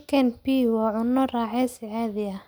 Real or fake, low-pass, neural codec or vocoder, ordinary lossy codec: real; none; none; none